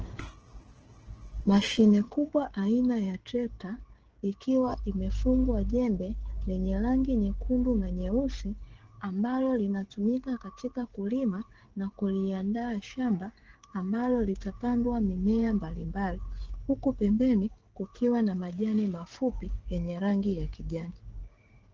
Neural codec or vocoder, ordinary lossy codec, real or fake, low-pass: codec, 16 kHz, 16 kbps, FreqCodec, smaller model; Opus, 16 kbps; fake; 7.2 kHz